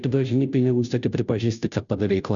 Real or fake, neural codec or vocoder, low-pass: fake; codec, 16 kHz, 0.5 kbps, FunCodec, trained on Chinese and English, 25 frames a second; 7.2 kHz